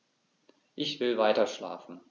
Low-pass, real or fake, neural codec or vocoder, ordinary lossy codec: 7.2 kHz; real; none; none